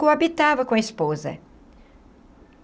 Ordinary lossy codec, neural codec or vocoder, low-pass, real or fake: none; none; none; real